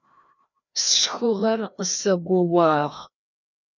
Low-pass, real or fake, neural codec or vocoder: 7.2 kHz; fake; codec, 16 kHz, 1 kbps, FreqCodec, larger model